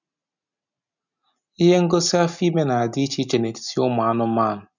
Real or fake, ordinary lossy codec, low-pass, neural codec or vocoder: real; none; 7.2 kHz; none